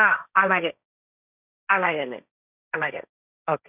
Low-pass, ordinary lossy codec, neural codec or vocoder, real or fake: 3.6 kHz; none; codec, 16 kHz, 1.1 kbps, Voila-Tokenizer; fake